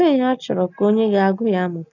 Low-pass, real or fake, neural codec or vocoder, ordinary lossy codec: 7.2 kHz; real; none; none